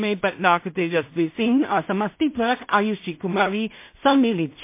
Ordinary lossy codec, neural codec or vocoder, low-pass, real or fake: MP3, 24 kbps; codec, 16 kHz in and 24 kHz out, 0.4 kbps, LongCat-Audio-Codec, two codebook decoder; 3.6 kHz; fake